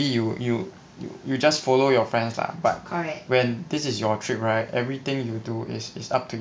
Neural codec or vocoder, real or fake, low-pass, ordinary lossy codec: none; real; none; none